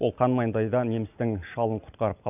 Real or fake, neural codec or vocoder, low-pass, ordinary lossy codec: real; none; 3.6 kHz; none